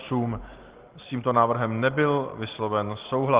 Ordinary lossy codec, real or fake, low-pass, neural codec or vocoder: Opus, 16 kbps; real; 3.6 kHz; none